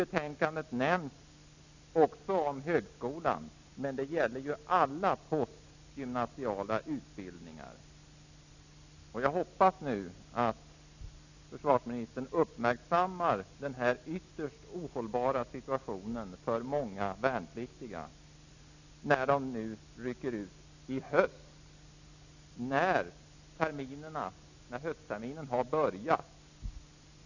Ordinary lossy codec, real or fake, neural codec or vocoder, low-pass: none; real; none; 7.2 kHz